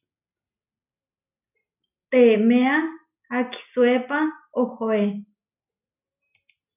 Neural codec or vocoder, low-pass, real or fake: none; 3.6 kHz; real